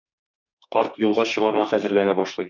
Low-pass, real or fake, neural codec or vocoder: 7.2 kHz; fake; codec, 32 kHz, 1.9 kbps, SNAC